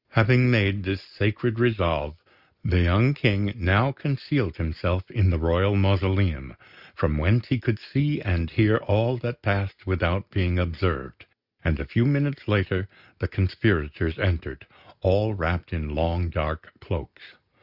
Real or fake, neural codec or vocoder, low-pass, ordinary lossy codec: real; none; 5.4 kHz; Opus, 64 kbps